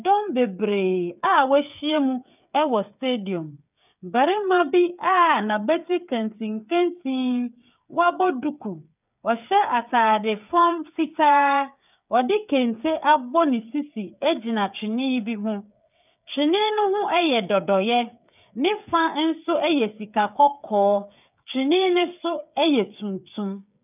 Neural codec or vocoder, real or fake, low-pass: codec, 16 kHz, 8 kbps, FreqCodec, smaller model; fake; 3.6 kHz